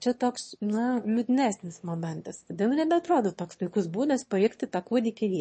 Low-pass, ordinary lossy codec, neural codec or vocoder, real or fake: 9.9 kHz; MP3, 32 kbps; autoencoder, 22.05 kHz, a latent of 192 numbers a frame, VITS, trained on one speaker; fake